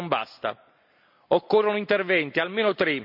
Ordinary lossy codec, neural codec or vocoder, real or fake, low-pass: none; none; real; 5.4 kHz